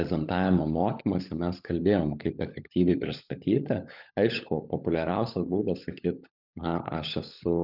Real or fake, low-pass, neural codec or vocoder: fake; 5.4 kHz; codec, 16 kHz, 16 kbps, FunCodec, trained on LibriTTS, 50 frames a second